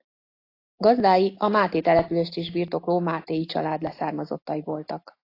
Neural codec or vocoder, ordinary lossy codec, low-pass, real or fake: none; AAC, 32 kbps; 5.4 kHz; real